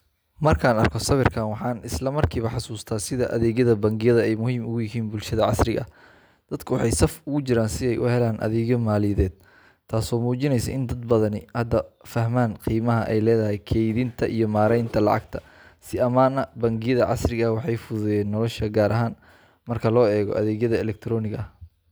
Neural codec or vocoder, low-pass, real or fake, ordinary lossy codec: none; none; real; none